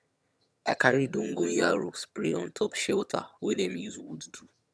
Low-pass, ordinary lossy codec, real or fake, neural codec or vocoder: none; none; fake; vocoder, 22.05 kHz, 80 mel bands, HiFi-GAN